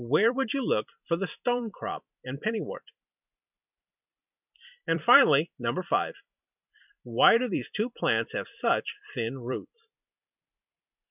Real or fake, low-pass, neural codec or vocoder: fake; 3.6 kHz; vocoder, 44.1 kHz, 128 mel bands every 256 samples, BigVGAN v2